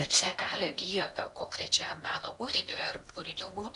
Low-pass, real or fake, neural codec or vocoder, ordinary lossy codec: 10.8 kHz; fake; codec, 16 kHz in and 24 kHz out, 0.8 kbps, FocalCodec, streaming, 65536 codes; AAC, 64 kbps